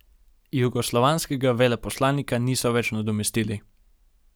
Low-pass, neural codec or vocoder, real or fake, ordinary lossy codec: none; none; real; none